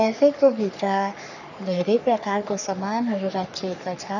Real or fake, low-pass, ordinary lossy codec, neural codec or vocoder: fake; 7.2 kHz; none; codec, 44.1 kHz, 3.4 kbps, Pupu-Codec